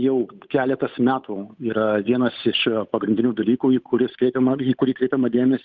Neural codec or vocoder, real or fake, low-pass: codec, 16 kHz, 8 kbps, FunCodec, trained on Chinese and English, 25 frames a second; fake; 7.2 kHz